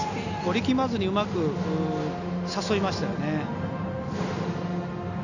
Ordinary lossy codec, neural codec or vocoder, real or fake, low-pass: none; none; real; 7.2 kHz